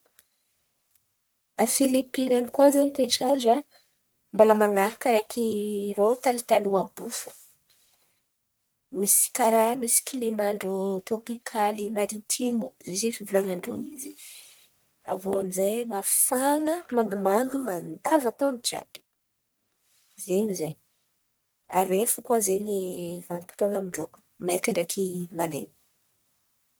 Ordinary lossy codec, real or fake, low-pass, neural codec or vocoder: none; fake; none; codec, 44.1 kHz, 1.7 kbps, Pupu-Codec